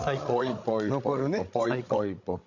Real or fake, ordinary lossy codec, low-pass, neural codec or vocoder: fake; none; 7.2 kHz; codec, 16 kHz, 16 kbps, FreqCodec, larger model